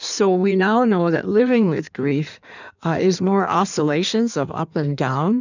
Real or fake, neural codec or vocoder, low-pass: fake; codec, 16 kHz in and 24 kHz out, 1.1 kbps, FireRedTTS-2 codec; 7.2 kHz